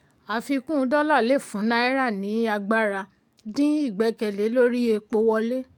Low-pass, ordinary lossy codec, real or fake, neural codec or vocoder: 19.8 kHz; none; fake; codec, 44.1 kHz, 7.8 kbps, DAC